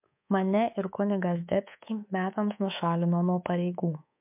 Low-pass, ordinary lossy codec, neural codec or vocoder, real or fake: 3.6 kHz; MP3, 32 kbps; codec, 24 kHz, 3.1 kbps, DualCodec; fake